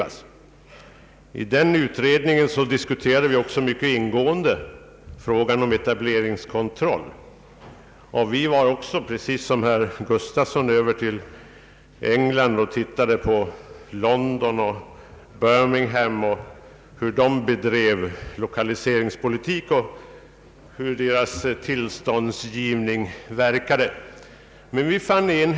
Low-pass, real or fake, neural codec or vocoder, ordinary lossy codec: none; real; none; none